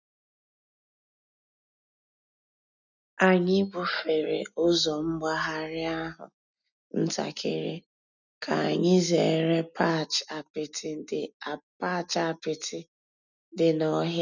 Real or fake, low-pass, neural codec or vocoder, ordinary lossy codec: real; 7.2 kHz; none; none